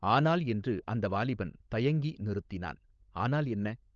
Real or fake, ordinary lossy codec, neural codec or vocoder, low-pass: fake; Opus, 24 kbps; codec, 16 kHz, 16 kbps, FunCodec, trained on LibriTTS, 50 frames a second; 7.2 kHz